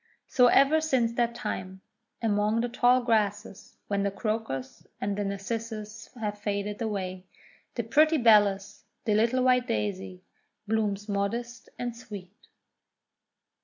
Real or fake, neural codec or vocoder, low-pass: real; none; 7.2 kHz